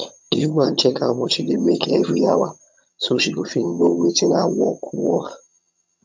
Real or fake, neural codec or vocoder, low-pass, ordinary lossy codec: fake; vocoder, 22.05 kHz, 80 mel bands, HiFi-GAN; 7.2 kHz; MP3, 64 kbps